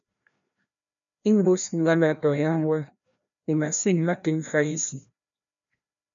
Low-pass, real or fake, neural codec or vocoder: 7.2 kHz; fake; codec, 16 kHz, 1 kbps, FreqCodec, larger model